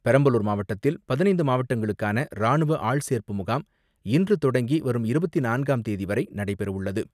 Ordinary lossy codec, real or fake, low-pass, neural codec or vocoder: none; real; 14.4 kHz; none